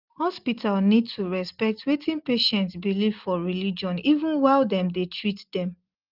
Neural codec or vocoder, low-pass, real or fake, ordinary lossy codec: none; 5.4 kHz; real; Opus, 32 kbps